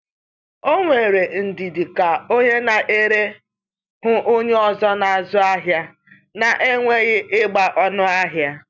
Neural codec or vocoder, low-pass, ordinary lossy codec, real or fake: none; 7.2 kHz; none; real